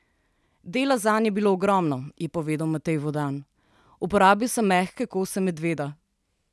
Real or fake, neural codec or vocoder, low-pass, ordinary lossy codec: real; none; none; none